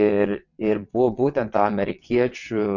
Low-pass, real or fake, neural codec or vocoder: 7.2 kHz; fake; vocoder, 22.05 kHz, 80 mel bands, WaveNeXt